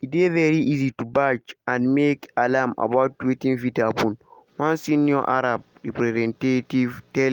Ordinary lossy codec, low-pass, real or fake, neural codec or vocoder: Opus, 32 kbps; 19.8 kHz; real; none